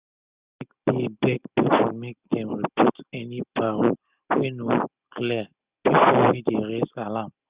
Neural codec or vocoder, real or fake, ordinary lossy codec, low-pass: none; real; Opus, 64 kbps; 3.6 kHz